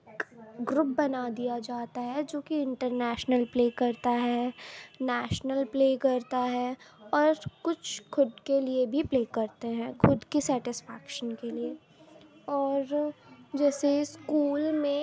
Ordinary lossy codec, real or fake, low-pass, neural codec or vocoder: none; real; none; none